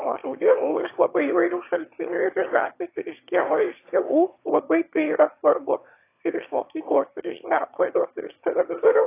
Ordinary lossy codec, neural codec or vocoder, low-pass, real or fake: AAC, 24 kbps; autoencoder, 22.05 kHz, a latent of 192 numbers a frame, VITS, trained on one speaker; 3.6 kHz; fake